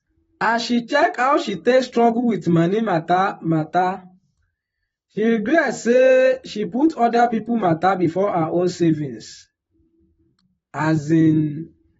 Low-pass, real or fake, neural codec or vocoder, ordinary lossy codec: 19.8 kHz; fake; vocoder, 44.1 kHz, 128 mel bands, Pupu-Vocoder; AAC, 24 kbps